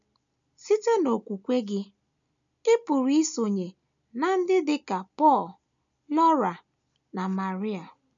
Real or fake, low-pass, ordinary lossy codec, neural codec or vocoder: real; 7.2 kHz; none; none